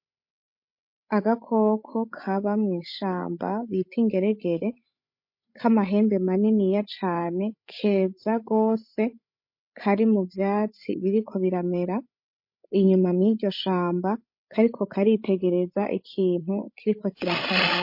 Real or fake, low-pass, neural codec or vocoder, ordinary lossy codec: fake; 5.4 kHz; codec, 16 kHz, 16 kbps, FreqCodec, larger model; MP3, 32 kbps